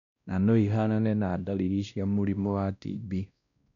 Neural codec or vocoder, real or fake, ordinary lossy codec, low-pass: codec, 16 kHz, 1 kbps, X-Codec, WavLM features, trained on Multilingual LibriSpeech; fake; none; 7.2 kHz